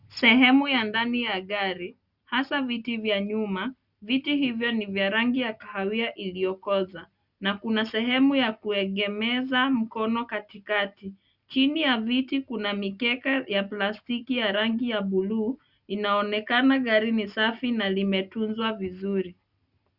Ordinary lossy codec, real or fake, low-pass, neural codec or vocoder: Opus, 64 kbps; real; 5.4 kHz; none